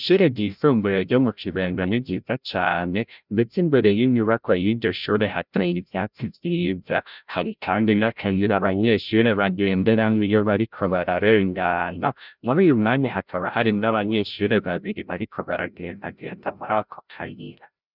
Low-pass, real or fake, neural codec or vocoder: 5.4 kHz; fake; codec, 16 kHz, 0.5 kbps, FreqCodec, larger model